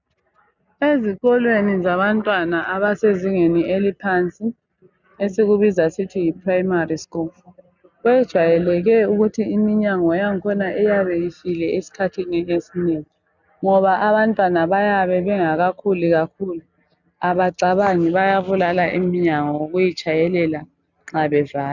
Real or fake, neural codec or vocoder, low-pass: real; none; 7.2 kHz